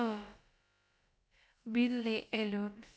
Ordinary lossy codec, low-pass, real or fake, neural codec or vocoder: none; none; fake; codec, 16 kHz, about 1 kbps, DyCAST, with the encoder's durations